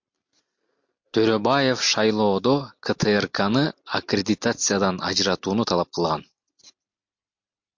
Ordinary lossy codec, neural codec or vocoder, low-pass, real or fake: MP3, 48 kbps; none; 7.2 kHz; real